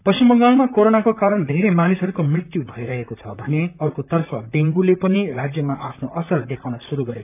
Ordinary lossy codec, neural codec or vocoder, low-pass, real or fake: none; vocoder, 44.1 kHz, 128 mel bands, Pupu-Vocoder; 3.6 kHz; fake